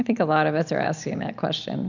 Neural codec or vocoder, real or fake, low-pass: none; real; 7.2 kHz